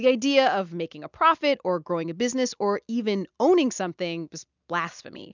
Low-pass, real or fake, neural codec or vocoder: 7.2 kHz; real; none